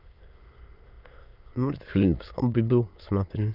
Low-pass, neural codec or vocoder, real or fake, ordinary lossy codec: 5.4 kHz; autoencoder, 22.05 kHz, a latent of 192 numbers a frame, VITS, trained on many speakers; fake; none